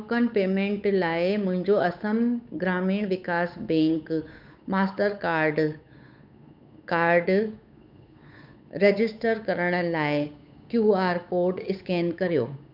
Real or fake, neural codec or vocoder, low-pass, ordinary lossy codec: fake; codec, 16 kHz, 8 kbps, FunCodec, trained on Chinese and English, 25 frames a second; 5.4 kHz; none